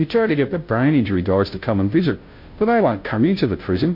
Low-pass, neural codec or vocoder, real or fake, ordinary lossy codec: 5.4 kHz; codec, 16 kHz, 0.5 kbps, FunCodec, trained on Chinese and English, 25 frames a second; fake; MP3, 32 kbps